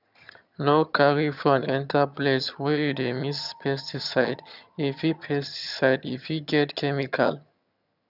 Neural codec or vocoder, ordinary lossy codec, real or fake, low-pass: vocoder, 22.05 kHz, 80 mel bands, HiFi-GAN; none; fake; 5.4 kHz